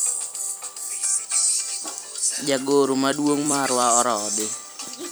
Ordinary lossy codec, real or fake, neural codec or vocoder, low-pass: none; fake; vocoder, 44.1 kHz, 128 mel bands every 256 samples, BigVGAN v2; none